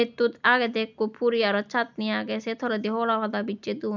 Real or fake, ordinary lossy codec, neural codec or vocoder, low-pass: real; none; none; 7.2 kHz